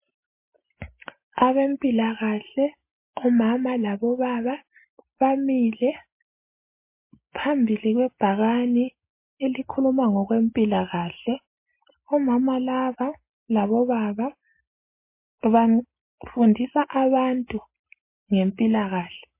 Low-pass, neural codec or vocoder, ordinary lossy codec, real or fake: 3.6 kHz; none; MP3, 24 kbps; real